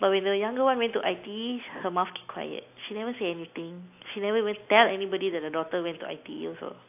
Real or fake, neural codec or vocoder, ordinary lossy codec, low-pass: real; none; AAC, 32 kbps; 3.6 kHz